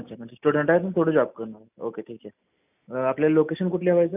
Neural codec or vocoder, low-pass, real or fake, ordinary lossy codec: none; 3.6 kHz; real; none